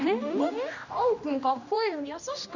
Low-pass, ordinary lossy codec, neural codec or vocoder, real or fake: 7.2 kHz; none; codec, 16 kHz, 1 kbps, X-Codec, HuBERT features, trained on general audio; fake